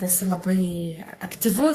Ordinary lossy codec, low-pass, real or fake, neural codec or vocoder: AAC, 64 kbps; 14.4 kHz; fake; codec, 44.1 kHz, 3.4 kbps, Pupu-Codec